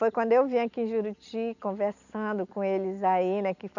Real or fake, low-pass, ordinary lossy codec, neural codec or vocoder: real; 7.2 kHz; none; none